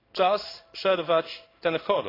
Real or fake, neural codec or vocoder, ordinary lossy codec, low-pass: fake; codec, 16 kHz in and 24 kHz out, 1 kbps, XY-Tokenizer; AAC, 24 kbps; 5.4 kHz